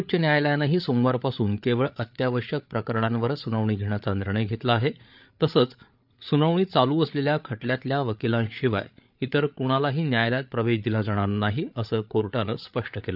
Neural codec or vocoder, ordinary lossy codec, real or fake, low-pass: codec, 16 kHz, 8 kbps, FreqCodec, larger model; none; fake; 5.4 kHz